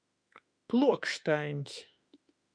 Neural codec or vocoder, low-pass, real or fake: autoencoder, 48 kHz, 32 numbers a frame, DAC-VAE, trained on Japanese speech; 9.9 kHz; fake